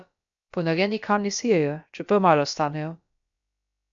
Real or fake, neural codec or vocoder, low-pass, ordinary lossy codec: fake; codec, 16 kHz, about 1 kbps, DyCAST, with the encoder's durations; 7.2 kHz; MP3, 64 kbps